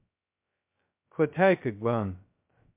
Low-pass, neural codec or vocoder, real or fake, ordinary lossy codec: 3.6 kHz; codec, 16 kHz, 0.2 kbps, FocalCodec; fake; MP3, 32 kbps